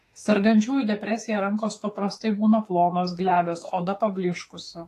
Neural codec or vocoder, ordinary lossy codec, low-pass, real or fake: autoencoder, 48 kHz, 32 numbers a frame, DAC-VAE, trained on Japanese speech; AAC, 48 kbps; 14.4 kHz; fake